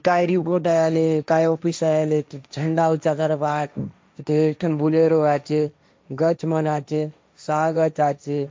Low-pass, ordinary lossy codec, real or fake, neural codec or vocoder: none; none; fake; codec, 16 kHz, 1.1 kbps, Voila-Tokenizer